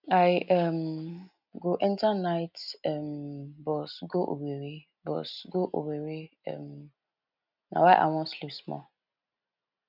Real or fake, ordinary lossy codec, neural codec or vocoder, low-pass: real; none; none; 5.4 kHz